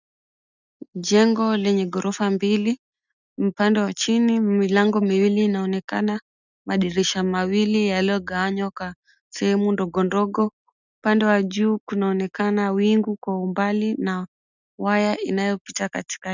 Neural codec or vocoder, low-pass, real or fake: none; 7.2 kHz; real